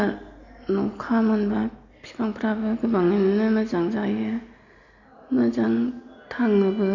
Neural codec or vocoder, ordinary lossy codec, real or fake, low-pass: none; none; real; 7.2 kHz